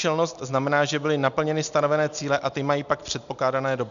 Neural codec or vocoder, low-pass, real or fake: none; 7.2 kHz; real